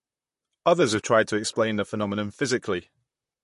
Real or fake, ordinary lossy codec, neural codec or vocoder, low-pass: fake; MP3, 48 kbps; vocoder, 44.1 kHz, 128 mel bands, Pupu-Vocoder; 14.4 kHz